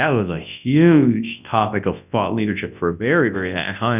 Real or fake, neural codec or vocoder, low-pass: fake; codec, 24 kHz, 0.9 kbps, WavTokenizer, large speech release; 3.6 kHz